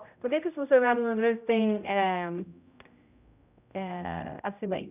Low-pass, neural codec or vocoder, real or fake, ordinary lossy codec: 3.6 kHz; codec, 16 kHz, 0.5 kbps, X-Codec, HuBERT features, trained on general audio; fake; none